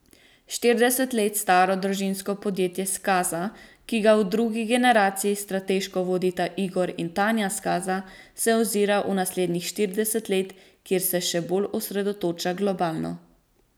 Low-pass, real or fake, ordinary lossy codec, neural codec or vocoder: none; real; none; none